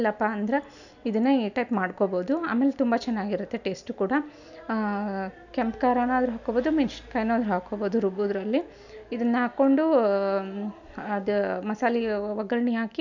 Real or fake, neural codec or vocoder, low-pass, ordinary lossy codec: real; none; 7.2 kHz; none